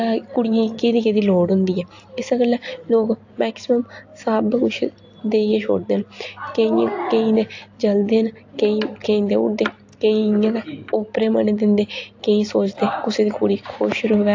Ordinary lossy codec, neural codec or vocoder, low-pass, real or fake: AAC, 48 kbps; none; 7.2 kHz; real